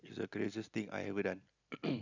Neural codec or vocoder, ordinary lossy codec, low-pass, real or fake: none; none; 7.2 kHz; real